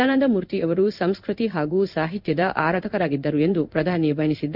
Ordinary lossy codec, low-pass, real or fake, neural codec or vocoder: none; 5.4 kHz; fake; codec, 16 kHz in and 24 kHz out, 1 kbps, XY-Tokenizer